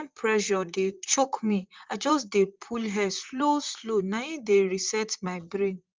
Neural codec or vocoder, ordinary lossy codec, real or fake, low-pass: vocoder, 44.1 kHz, 128 mel bands, Pupu-Vocoder; Opus, 24 kbps; fake; 7.2 kHz